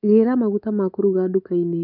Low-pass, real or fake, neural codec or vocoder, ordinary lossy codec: 5.4 kHz; fake; codec, 24 kHz, 3.1 kbps, DualCodec; none